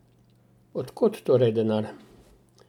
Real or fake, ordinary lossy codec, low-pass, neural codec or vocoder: real; none; 19.8 kHz; none